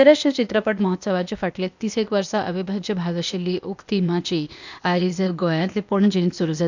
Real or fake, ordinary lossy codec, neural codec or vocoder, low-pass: fake; none; codec, 16 kHz, 0.8 kbps, ZipCodec; 7.2 kHz